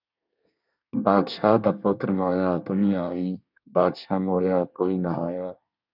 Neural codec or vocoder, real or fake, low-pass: codec, 24 kHz, 1 kbps, SNAC; fake; 5.4 kHz